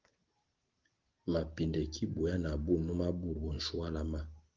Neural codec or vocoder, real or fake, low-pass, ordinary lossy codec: none; real; 7.2 kHz; Opus, 16 kbps